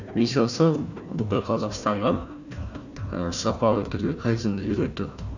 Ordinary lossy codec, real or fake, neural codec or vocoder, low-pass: none; fake; codec, 16 kHz, 1 kbps, FunCodec, trained on Chinese and English, 50 frames a second; 7.2 kHz